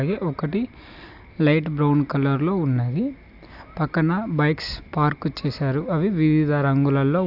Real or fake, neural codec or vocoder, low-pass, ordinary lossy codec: real; none; 5.4 kHz; AAC, 48 kbps